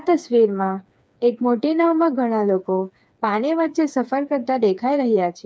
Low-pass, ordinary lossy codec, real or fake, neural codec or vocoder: none; none; fake; codec, 16 kHz, 4 kbps, FreqCodec, smaller model